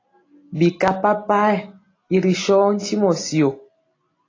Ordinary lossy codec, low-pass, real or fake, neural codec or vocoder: AAC, 32 kbps; 7.2 kHz; real; none